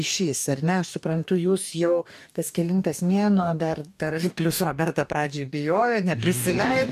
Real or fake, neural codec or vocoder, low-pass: fake; codec, 44.1 kHz, 2.6 kbps, DAC; 14.4 kHz